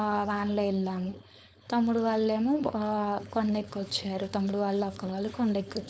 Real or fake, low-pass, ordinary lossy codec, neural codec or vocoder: fake; none; none; codec, 16 kHz, 4.8 kbps, FACodec